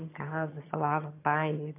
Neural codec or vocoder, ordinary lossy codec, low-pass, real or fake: vocoder, 22.05 kHz, 80 mel bands, HiFi-GAN; AAC, 24 kbps; 3.6 kHz; fake